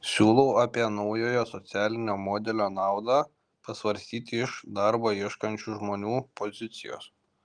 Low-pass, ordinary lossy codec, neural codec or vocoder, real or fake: 9.9 kHz; Opus, 32 kbps; none; real